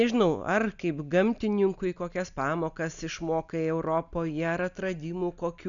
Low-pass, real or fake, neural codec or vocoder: 7.2 kHz; real; none